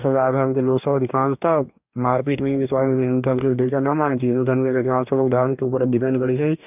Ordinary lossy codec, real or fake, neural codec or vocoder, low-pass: none; fake; codec, 44.1 kHz, 2.6 kbps, DAC; 3.6 kHz